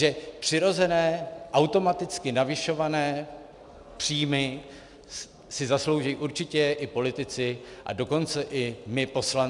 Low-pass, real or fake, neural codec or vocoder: 10.8 kHz; real; none